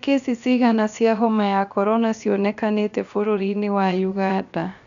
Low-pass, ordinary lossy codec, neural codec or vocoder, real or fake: 7.2 kHz; none; codec, 16 kHz, 0.7 kbps, FocalCodec; fake